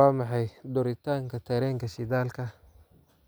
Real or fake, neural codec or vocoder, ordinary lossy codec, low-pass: real; none; none; none